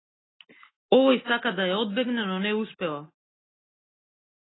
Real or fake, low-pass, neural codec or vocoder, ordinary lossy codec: real; 7.2 kHz; none; AAC, 16 kbps